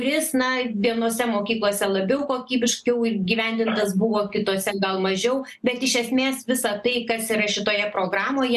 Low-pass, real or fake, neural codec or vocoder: 14.4 kHz; real; none